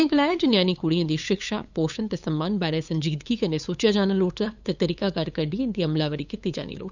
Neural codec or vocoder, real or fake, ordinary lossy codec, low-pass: codec, 16 kHz, 8 kbps, FunCodec, trained on LibriTTS, 25 frames a second; fake; none; 7.2 kHz